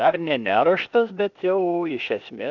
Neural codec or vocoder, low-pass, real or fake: codec, 16 kHz, 0.8 kbps, ZipCodec; 7.2 kHz; fake